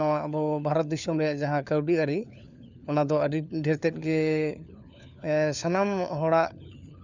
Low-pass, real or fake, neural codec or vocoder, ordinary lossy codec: 7.2 kHz; fake; codec, 16 kHz, 4 kbps, FunCodec, trained on LibriTTS, 50 frames a second; none